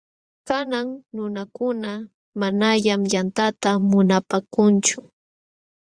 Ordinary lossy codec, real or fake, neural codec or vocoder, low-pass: Opus, 64 kbps; fake; vocoder, 22.05 kHz, 80 mel bands, Vocos; 9.9 kHz